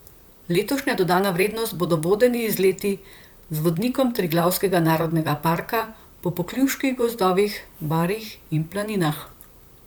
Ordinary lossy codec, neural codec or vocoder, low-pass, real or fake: none; vocoder, 44.1 kHz, 128 mel bands, Pupu-Vocoder; none; fake